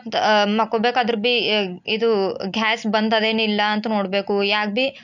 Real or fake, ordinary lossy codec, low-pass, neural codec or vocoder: real; none; 7.2 kHz; none